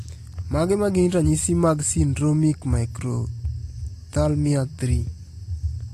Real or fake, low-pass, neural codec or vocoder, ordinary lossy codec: real; 14.4 kHz; none; AAC, 48 kbps